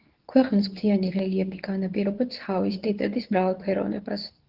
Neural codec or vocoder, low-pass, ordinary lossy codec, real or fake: codec, 24 kHz, 0.9 kbps, WavTokenizer, medium speech release version 2; 5.4 kHz; Opus, 16 kbps; fake